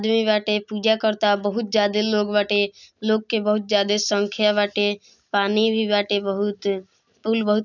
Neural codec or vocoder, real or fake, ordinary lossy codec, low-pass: none; real; none; 7.2 kHz